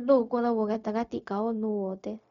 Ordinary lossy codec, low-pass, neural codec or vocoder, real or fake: none; 7.2 kHz; codec, 16 kHz, 0.4 kbps, LongCat-Audio-Codec; fake